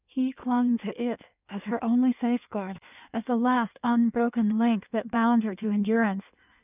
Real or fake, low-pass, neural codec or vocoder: fake; 3.6 kHz; codec, 16 kHz in and 24 kHz out, 1.1 kbps, FireRedTTS-2 codec